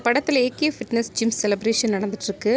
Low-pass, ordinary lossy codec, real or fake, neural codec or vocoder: none; none; real; none